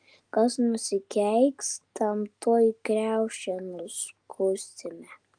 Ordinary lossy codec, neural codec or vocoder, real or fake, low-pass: Opus, 32 kbps; none; real; 9.9 kHz